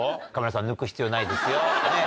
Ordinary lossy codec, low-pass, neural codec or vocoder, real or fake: none; none; none; real